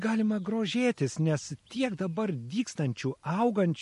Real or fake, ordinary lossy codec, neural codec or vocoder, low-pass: real; MP3, 48 kbps; none; 14.4 kHz